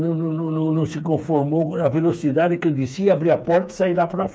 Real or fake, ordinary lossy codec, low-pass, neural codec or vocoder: fake; none; none; codec, 16 kHz, 4 kbps, FreqCodec, smaller model